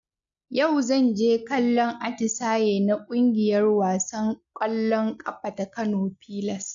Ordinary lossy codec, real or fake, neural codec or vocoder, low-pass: AAC, 64 kbps; real; none; 7.2 kHz